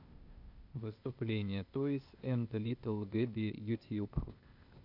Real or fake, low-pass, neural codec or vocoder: fake; 5.4 kHz; codec, 16 kHz, 0.8 kbps, ZipCodec